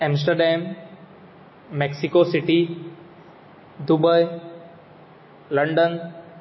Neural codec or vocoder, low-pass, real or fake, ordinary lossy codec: none; 7.2 kHz; real; MP3, 24 kbps